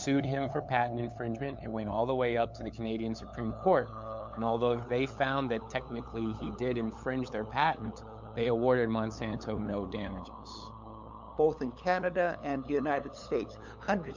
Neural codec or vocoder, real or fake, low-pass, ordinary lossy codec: codec, 16 kHz, 8 kbps, FunCodec, trained on LibriTTS, 25 frames a second; fake; 7.2 kHz; MP3, 64 kbps